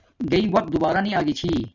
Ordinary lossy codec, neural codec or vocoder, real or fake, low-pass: Opus, 64 kbps; none; real; 7.2 kHz